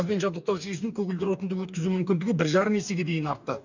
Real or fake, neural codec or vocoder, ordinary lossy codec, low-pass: fake; codec, 44.1 kHz, 2.6 kbps, DAC; none; 7.2 kHz